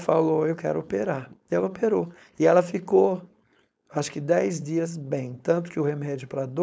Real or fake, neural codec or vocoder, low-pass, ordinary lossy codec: fake; codec, 16 kHz, 4.8 kbps, FACodec; none; none